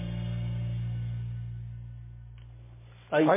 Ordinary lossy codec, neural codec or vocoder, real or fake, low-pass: MP3, 16 kbps; none; real; 3.6 kHz